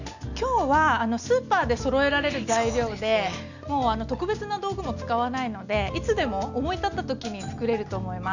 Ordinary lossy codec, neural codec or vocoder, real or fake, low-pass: none; none; real; 7.2 kHz